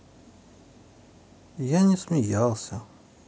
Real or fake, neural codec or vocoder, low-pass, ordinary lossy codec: real; none; none; none